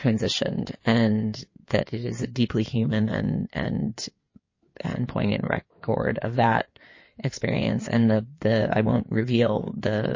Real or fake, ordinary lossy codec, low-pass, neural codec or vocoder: fake; MP3, 32 kbps; 7.2 kHz; codec, 16 kHz in and 24 kHz out, 2.2 kbps, FireRedTTS-2 codec